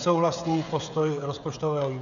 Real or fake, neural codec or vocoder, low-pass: fake; codec, 16 kHz, 16 kbps, FreqCodec, smaller model; 7.2 kHz